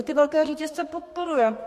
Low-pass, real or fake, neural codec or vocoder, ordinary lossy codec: 14.4 kHz; fake; codec, 32 kHz, 1.9 kbps, SNAC; MP3, 64 kbps